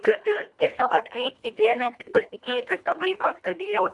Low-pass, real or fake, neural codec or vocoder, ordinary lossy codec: 10.8 kHz; fake; codec, 24 kHz, 1.5 kbps, HILCodec; MP3, 96 kbps